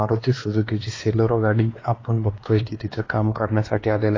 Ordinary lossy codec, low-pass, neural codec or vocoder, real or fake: AAC, 32 kbps; 7.2 kHz; codec, 16 kHz, 2 kbps, X-Codec, WavLM features, trained on Multilingual LibriSpeech; fake